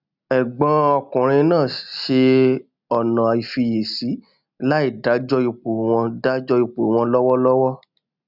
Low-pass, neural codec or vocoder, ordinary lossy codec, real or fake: 5.4 kHz; none; none; real